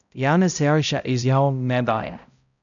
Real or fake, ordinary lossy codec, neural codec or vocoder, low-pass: fake; none; codec, 16 kHz, 0.5 kbps, X-Codec, HuBERT features, trained on balanced general audio; 7.2 kHz